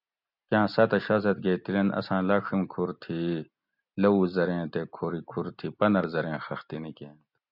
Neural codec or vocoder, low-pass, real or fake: none; 5.4 kHz; real